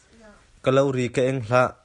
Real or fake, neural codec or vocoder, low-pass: real; none; 10.8 kHz